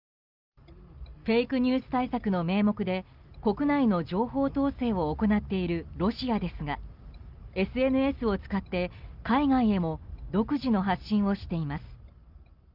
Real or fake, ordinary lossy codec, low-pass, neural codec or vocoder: real; Opus, 32 kbps; 5.4 kHz; none